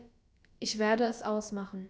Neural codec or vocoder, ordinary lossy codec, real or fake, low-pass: codec, 16 kHz, about 1 kbps, DyCAST, with the encoder's durations; none; fake; none